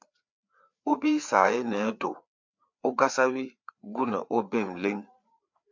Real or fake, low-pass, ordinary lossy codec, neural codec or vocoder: fake; 7.2 kHz; MP3, 64 kbps; codec, 16 kHz, 4 kbps, FreqCodec, larger model